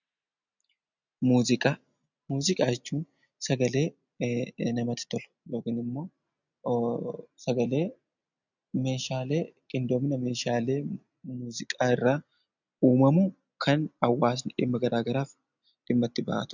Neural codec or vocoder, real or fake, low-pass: none; real; 7.2 kHz